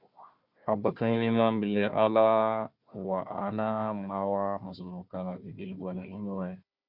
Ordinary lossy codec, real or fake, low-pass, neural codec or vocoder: Opus, 64 kbps; fake; 5.4 kHz; codec, 16 kHz, 1 kbps, FunCodec, trained on Chinese and English, 50 frames a second